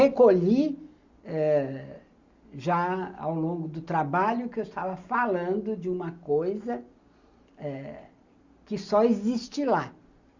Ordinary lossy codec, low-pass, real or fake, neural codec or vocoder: Opus, 64 kbps; 7.2 kHz; real; none